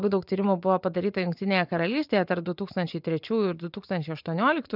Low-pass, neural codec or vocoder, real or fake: 5.4 kHz; vocoder, 24 kHz, 100 mel bands, Vocos; fake